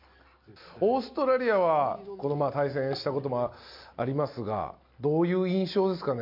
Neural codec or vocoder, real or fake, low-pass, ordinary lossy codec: none; real; 5.4 kHz; MP3, 48 kbps